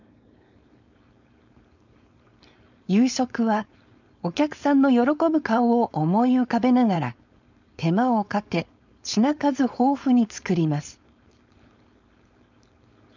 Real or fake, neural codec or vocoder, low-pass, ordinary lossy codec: fake; codec, 16 kHz, 4.8 kbps, FACodec; 7.2 kHz; none